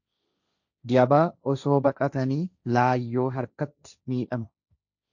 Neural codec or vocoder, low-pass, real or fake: codec, 16 kHz, 1.1 kbps, Voila-Tokenizer; 7.2 kHz; fake